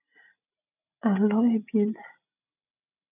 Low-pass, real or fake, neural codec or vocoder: 3.6 kHz; real; none